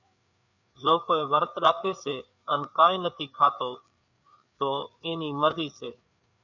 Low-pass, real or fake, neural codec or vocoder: 7.2 kHz; fake; codec, 16 kHz, 4 kbps, FreqCodec, larger model